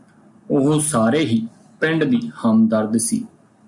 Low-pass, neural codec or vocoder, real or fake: 10.8 kHz; none; real